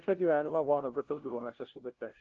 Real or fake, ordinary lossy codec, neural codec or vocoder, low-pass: fake; Opus, 16 kbps; codec, 16 kHz, 0.5 kbps, FunCodec, trained on LibriTTS, 25 frames a second; 7.2 kHz